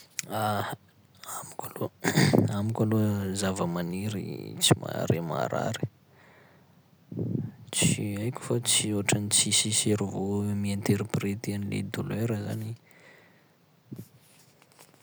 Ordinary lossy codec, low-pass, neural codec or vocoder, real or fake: none; none; none; real